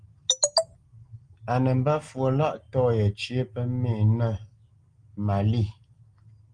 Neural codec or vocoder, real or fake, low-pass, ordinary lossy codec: none; real; 9.9 kHz; Opus, 24 kbps